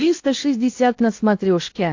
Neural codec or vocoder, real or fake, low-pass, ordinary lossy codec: codec, 16 kHz in and 24 kHz out, 0.8 kbps, FocalCodec, streaming, 65536 codes; fake; 7.2 kHz; AAC, 48 kbps